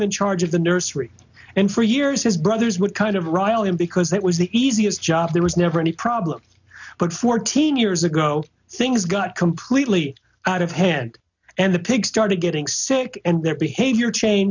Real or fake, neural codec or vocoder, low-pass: real; none; 7.2 kHz